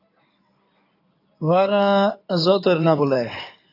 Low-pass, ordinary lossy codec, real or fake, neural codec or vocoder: 5.4 kHz; AAC, 32 kbps; fake; codec, 16 kHz in and 24 kHz out, 2.2 kbps, FireRedTTS-2 codec